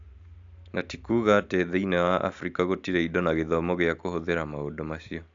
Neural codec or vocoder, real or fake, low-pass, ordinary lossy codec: none; real; 7.2 kHz; AAC, 64 kbps